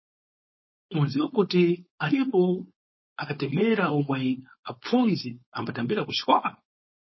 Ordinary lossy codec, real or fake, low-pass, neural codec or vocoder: MP3, 24 kbps; fake; 7.2 kHz; codec, 16 kHz, 4.8 kbps, FACodec